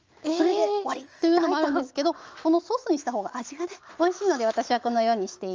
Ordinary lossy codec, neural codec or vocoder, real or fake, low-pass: Opus, 24 kbps; autoencoder, 48 kHz, 128 numbers a frame, DAC-VAE, trained on Japanese speech; fake; 7.2 kHz